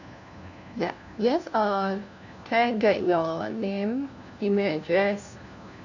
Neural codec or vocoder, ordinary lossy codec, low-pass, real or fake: codec, 16 kHz, 1 kbps, FunCodec, trained on LibriTTS, 50 frames a second; none; 7.2 kHz; fake